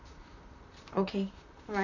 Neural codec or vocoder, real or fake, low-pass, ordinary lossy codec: vocoder, 44.1 kHz, 128 mel bands, Pupu-Vocoder; fake; 7.2 kHz; none